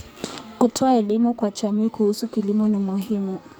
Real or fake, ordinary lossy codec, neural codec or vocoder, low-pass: fake; none; codec, 44.1 kHz, 2.6 kbps, SNAC; none